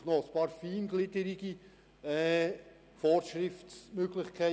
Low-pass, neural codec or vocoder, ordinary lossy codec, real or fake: none; none; none; real